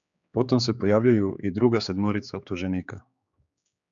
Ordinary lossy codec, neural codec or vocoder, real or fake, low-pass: MP3, 96 kbps; codec, 16 kHz, 4 kbps, X-Codec, HuBERT features, trained on general audio; fake; 7.2 kHz